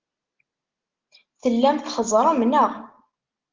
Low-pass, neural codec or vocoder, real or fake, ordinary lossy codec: 7.2 kHz; none; real; Opus, 16 kbps